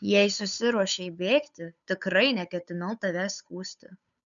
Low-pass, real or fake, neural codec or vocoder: 7.2 kHz; fake; codec, 16 kHz, 8 kbps, FunCodec, trained on Chinese and English, 25 frames a second